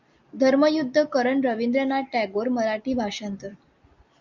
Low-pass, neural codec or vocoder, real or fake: 7.2 kHz; none; real